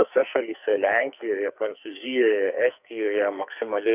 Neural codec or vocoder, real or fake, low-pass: codec, 44.1 kHz, 2.6 kbps, SNAC; fake; 3.6 kHz